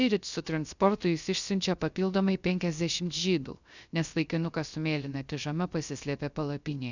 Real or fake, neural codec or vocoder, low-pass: fake; codec, 16 kHz, 0.3 kbps, FocalCodec; 7.2 kHz